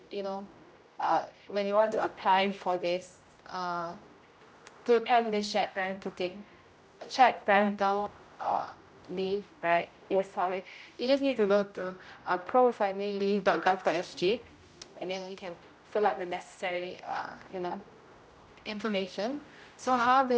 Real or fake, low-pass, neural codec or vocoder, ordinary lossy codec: fake; none; codec, 16 kHz, 0.5 kbps, X-Codec, HuBERT features, trained on general audio; none